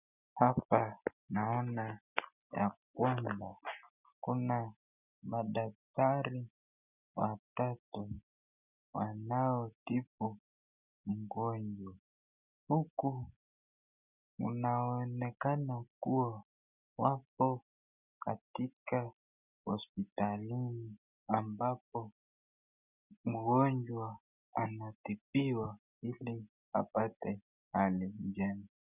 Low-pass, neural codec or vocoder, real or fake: 3.6 kHz; none; real